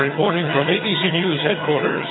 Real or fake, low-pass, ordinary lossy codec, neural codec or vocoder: fake; 7.2 kHz; AAC, 16 kbps; vocoder, 22.05 kHz, 80 mel bands, HiFi-GAN